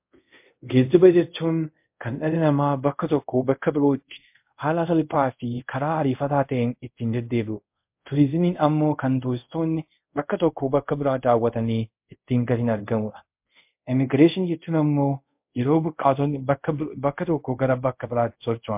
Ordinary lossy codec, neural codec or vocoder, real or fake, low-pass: MP3, 32 kbps; codec, 24 kHz, 0.5 kbps, DualCodec; fake; 3.6 kHz